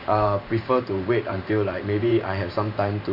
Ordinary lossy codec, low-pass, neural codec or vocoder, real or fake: MP3, 48 kbps; 5.4 kHz; vocoder, 44.1 kHz, 128 mel bands every 512 samples, BigVGAN v2; fake